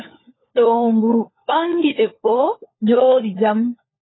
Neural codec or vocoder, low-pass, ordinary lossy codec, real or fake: codec, 16 kHz, 4 kbps, FunCodec, trained on LibriTTS, 50 frames a second; 7.2 kHz; AAC, 16 kbps; fake